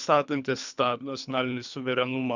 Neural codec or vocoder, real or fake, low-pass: codec, 24 kHz, 3 kbps, HILCodec; fake; 7.2 kHz